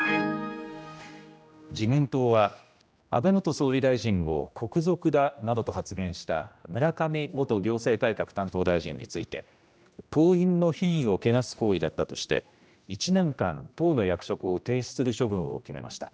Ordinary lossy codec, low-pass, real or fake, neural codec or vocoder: none; none; fake; codec, 16 kHz, 1 kbps, X-Codec, HuBERT features, trained on general audio